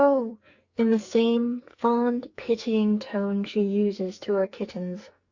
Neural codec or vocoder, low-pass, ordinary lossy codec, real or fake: codec, 44.1 kHz, 2.6 kbps, SNAC; 7.2 kHz; Opus, 64 kbps; fake